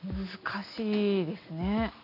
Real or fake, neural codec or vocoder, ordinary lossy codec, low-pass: real; none; none; 5.4 kHz